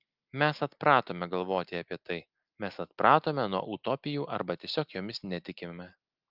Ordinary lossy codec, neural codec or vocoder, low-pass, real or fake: Opus, 24 kbps; none; 5.4 kHz; real